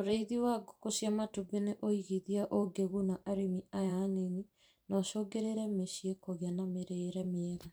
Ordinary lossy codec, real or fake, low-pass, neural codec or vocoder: none; fake; none; vocoder, 44.1 kHz, 128 mel bands every 512 samples, BigVGAN v2